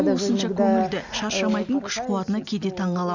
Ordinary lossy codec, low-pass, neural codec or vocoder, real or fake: none; 7.2 kHz; none; real